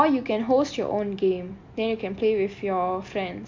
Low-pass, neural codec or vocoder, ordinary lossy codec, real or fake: 7.2 kHz; none; MP3, 48 kbps; real